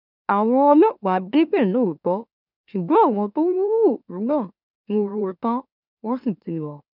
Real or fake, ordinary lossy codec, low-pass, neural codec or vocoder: fake; none; 5.4 kHz; autoencoder, 44.1 kHz, a latent of 192 numbers a frame, MeloTTS